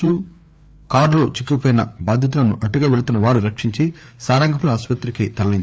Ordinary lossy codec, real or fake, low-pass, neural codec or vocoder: none; fake; none; codec, 16 kHz, 4 kbps, FreqCodec, larger model